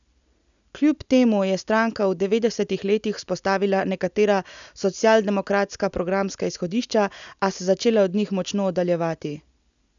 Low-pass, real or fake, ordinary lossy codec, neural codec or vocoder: 7.2 kHz; real; none; none